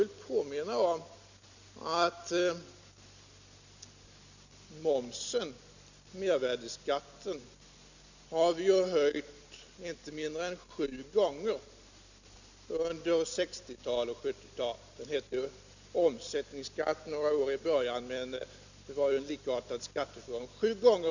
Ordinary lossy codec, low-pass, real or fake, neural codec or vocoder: none; 7.2 kHz; real; none